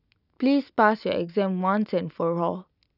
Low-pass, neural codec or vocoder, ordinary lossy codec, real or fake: 5.4 kHz; none; none; real